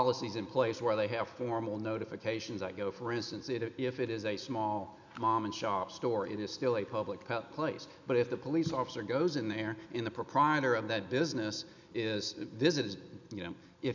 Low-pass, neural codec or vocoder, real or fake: 7.2 kHz; none; real